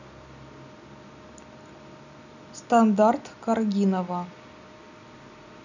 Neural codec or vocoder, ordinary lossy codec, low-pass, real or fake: none; none; 7.2 kHz; real